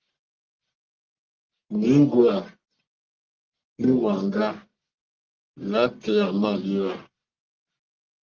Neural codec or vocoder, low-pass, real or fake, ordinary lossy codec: codec, 44.1 kHz, 1.7 kbps, Pupu-Codec; 7.2 kHz; fake; Opus, 32 kbps